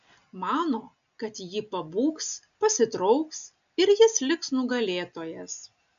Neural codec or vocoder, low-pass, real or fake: none; 7.2 kHz; real